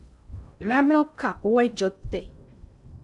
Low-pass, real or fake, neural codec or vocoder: 10.8 kHz; fake; codec, 16 kHz in and 24 kHz out, 0.6 kbps, FocalCodec, streaming, 2048 codes